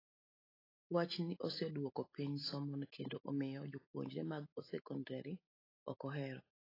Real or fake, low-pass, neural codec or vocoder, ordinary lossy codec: real; 5.4 kHz; none; AAC, 24 kbps